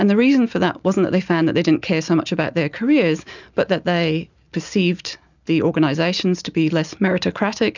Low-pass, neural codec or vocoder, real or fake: 7.2 kHz; none; real